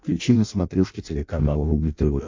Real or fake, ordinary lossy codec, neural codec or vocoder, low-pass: fake; MP3, 48 kbps; codec, 16 kHz in and 24 kHz out, 0.6 kbps, FireRedTTS-2 codec; 7.2 kHz